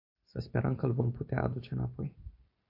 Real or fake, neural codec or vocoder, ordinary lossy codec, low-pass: real; none; AAC, 32 kbps; 5.4 kHz